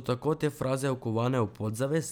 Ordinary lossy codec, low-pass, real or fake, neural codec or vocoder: none; none; real; none